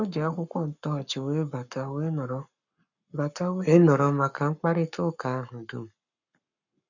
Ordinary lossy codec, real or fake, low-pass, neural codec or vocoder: none; fake; 7.2 kHz; codec, 44.1 kHz, 7.8 kbps, Pupu-Codec